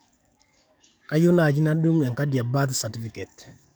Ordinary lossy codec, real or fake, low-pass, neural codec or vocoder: none; fake; none; codec, 44.1 kHz, 7.8 kbps, DAC